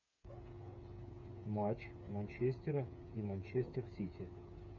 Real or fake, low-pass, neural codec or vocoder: fake; 7.2 kHz; codec, 16 kHz, 8 kbps, FreqCodec, smaller model